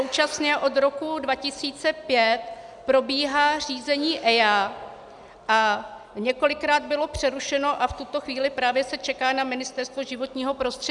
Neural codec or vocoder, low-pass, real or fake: none; 10.8 kHz; real